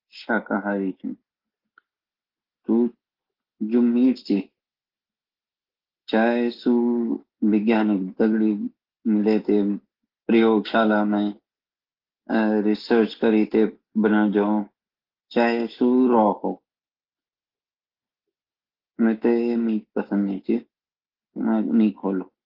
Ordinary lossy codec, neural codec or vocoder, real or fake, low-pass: Opus, 16 kbps; none; real; 5.4 kHz